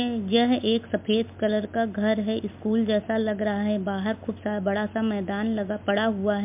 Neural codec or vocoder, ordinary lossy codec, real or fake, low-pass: none; MP3, 32 kbps; real; 3.6 kHz